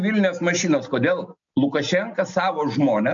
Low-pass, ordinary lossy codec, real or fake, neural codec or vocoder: 7.2 kHz; MP3, 64 kbps; real; none